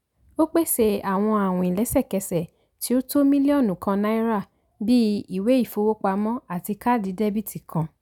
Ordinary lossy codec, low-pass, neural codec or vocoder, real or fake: none; 19.8 kHz; none; real